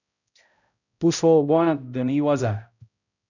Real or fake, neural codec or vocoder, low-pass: fake; codec, 16 kHz, 0.5 kbps, X-Codec, HuBERT features, trained on balanced general audio; 7.2 kHz